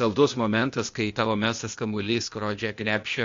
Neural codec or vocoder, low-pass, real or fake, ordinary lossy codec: codec, 16 kHz, 0.8 kbps, ZipCodec; 7.2 kHz; fake; MP3, 48 kbps